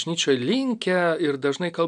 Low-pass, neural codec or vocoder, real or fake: 9.9 kHz; none; real